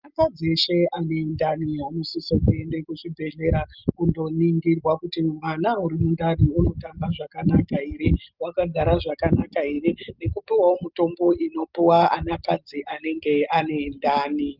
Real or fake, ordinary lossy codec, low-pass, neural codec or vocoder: real; Opus, 24 kbps; 5.4 kHz; none